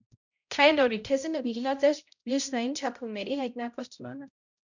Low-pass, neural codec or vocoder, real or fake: 7.2 kHz; codec, 16 kHz, 0.5 kbps, X-Codec, HuBERT features, trained on balanced general audio; fake